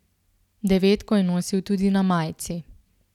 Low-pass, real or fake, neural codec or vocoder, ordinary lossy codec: 19.8 kHz; real; none; none